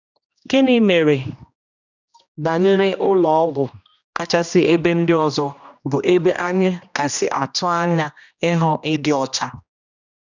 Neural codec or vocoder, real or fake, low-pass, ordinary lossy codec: codec, 16 kHz, 1 kbps, X-Codec, HuBERT features, trained on general audio; fake; 7.2 kHz; none